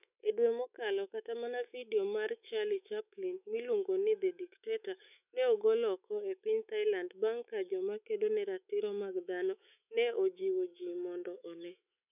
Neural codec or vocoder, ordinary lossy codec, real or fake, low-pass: autoencoder, 48 kHz, 128 numbers a frame, DAC-VAE, trained on Japanese speech; none; fake; 3.6 kHz